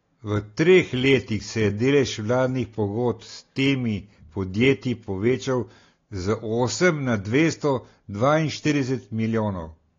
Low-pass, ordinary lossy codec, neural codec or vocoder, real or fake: 7.2 kHz; AAC, 32 kbps; none; real